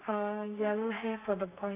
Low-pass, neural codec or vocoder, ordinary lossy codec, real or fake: 3.6 kHz; codec, 32 kHz, 1.9 kbps, SNAC; AAC, 24 kbps; fake